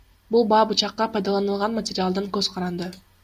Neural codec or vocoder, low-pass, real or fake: none; 14.4 kHz; real